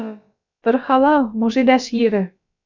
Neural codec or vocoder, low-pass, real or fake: codec, 16 kHz, about 1 kbps, DyCAST, with the encoder's durations; 7.2 kHz; fake